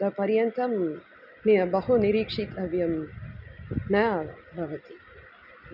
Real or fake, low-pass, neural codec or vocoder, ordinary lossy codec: real; 5.4 kHz; none; none